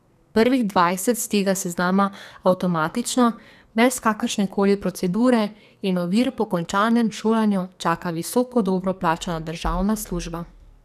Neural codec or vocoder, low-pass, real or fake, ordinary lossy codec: codec, 44.1 kHz, 2.6 kbps, SNAC; 14.4 kHz; fake; none